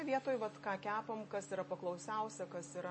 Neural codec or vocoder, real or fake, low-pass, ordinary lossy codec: none; real; 9.9 kHz; MP3, 32 kbps